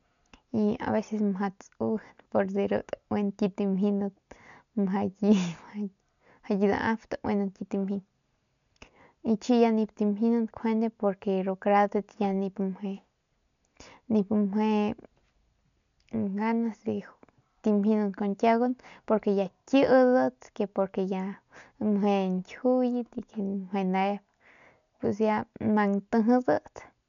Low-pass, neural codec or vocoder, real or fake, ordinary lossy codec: 7.2 kHz; none; real; none